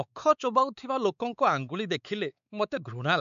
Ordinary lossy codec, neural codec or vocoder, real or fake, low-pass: none; codec, 16 kHz, 4 kbps, X-Codec, WavLM features, trained on Multilingual LibriSpeech; fake; 7.2 kHz